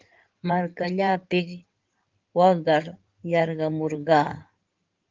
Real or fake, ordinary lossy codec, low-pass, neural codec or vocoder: fake; Opus, 32 kbps; 7.2 kHz; codec, 16 kHz in and 24 kHz out, 2.2 kbps, FireRedTTS-2 codec